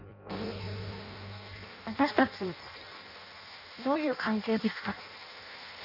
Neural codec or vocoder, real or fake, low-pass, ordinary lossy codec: codec, 16 kHz in and 24 kHz out, 0.6 kbps, FireRedTTS-2 codec; fake; 5.4 kHz; none